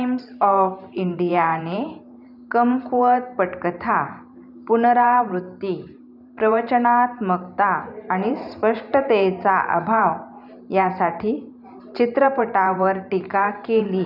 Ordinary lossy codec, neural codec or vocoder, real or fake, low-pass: none; vocoder, 44.1 kHz, 128 mel bands every 512 samples, BigVGAN v2; fake; 5.4 kHz